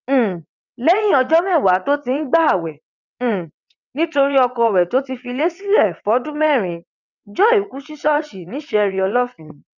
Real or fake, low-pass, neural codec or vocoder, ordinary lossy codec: fake; 7.2 kHz; vocoder, 22.05 kHz, 80 mel bands, WaveNeXt; none